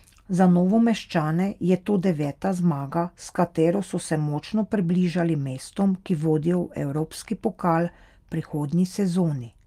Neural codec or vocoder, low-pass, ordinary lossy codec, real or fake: none; 14.4 kHz; Opus, 32 kbps; real